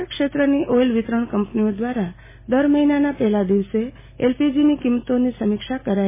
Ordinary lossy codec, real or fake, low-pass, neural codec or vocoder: MP3, 16 kbps; real; 3.6 kHz; none